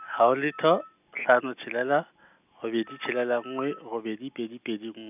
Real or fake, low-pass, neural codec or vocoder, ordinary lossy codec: real; 3.6 kHz; none; none